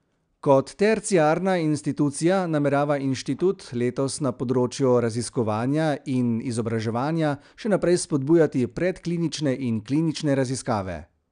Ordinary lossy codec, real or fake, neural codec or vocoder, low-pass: none; real; none; 9.9 kHz